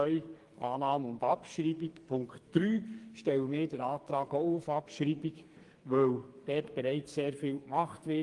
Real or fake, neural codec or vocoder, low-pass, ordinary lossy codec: fake; codec, 44.1 kHz, 2.6 kbps, SNAC; 10.8 kHz; Opus, 24 kbps